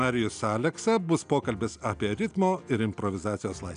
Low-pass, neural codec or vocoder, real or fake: 9.9 kHz; none; real